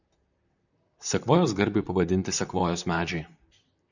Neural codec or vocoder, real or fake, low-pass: vocoder, 44.1 kHz, 128 mel bands, Pupu-Vocoder; fake; 7.2 kHz